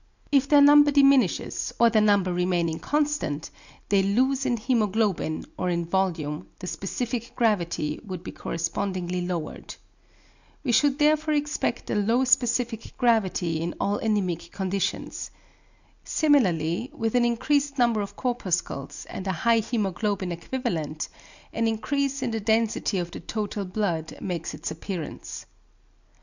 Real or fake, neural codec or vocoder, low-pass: real; none; 7.2 kHz